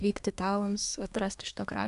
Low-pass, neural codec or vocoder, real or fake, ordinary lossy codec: 10.8 kHz; codec, 24 kHz, 1 kbps, SNAC; fake; AAC, 96 kbps